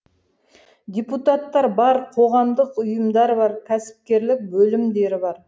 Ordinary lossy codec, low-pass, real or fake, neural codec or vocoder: none; none; real; none